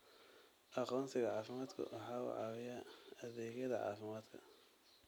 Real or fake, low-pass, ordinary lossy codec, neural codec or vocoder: real; none; none; none